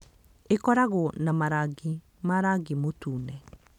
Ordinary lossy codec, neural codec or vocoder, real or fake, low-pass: none; none; real; 19.8 kHz